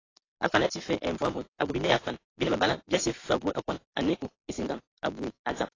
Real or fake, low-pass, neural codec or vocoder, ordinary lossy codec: real; 7.2 kHz; none; AAC, 32 kbps